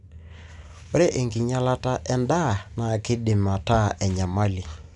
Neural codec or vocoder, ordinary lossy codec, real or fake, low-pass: none; none; real; 10.8 kHz